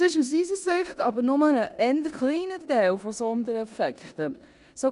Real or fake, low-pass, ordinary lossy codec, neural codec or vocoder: fake; 10.8 kHz; none; codec, 16 kHz in and 24 kHz out, 0.9 kbps, LongCat-Audio-Codec, four codebook decoder